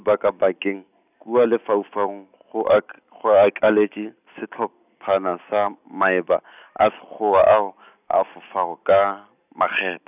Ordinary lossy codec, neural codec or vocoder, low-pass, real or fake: none; none; 3.6 kHz; real